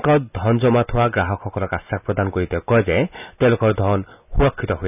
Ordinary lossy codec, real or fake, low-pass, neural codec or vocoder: none; real; 3.6 kHz; none